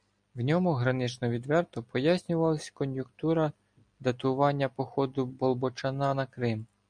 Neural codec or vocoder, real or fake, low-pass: none; real; 9.9 kHz